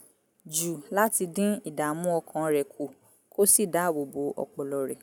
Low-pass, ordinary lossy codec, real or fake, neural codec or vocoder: none; none; real; none